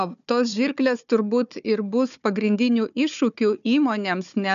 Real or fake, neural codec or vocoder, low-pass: fake; codec, 16 kHz, 4 kbps, FunCodec, trained on Chinese and English, 50 frames a second; 7.2 kHz